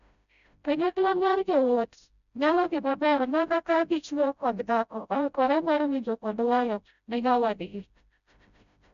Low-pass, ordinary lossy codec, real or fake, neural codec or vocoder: 7.2 kHz; none; fake; codec, 16 kHz, 0.5 kbps, FreqCodec, smaller model